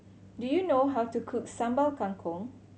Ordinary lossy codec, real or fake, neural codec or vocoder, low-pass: none; real; none; none